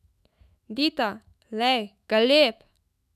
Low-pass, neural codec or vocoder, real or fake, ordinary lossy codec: 14.4 kHz; autoencoder, 48 kHz, 128 numbers a frame, DAC-VAE, trained on Japanese speech; fake; none